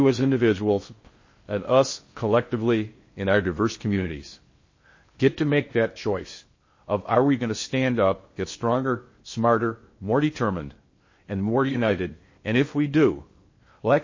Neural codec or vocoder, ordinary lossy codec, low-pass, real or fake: codec, 16 kHz in and 24 kHz out, 0.6 kbps, FocalCodec, streaming, 4096 codes; MP3, 32 kbps; 7.2 kHz; fake